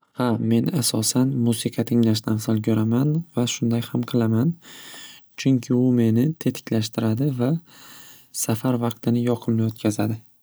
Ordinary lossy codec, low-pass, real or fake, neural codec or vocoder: none; none; real; none